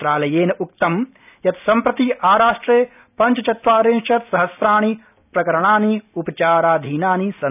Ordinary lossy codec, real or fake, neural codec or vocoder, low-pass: none; real; none; 3.6 kHz